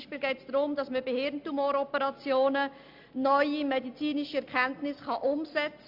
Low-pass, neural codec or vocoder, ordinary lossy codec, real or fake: 5.4 kHz; none; MP3, 48 kbps; real